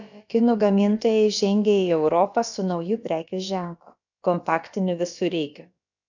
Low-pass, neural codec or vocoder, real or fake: 7.2 kHz; codec, 16 kHz, about 1 kbps, DyCAST, with the encoder's durations; fake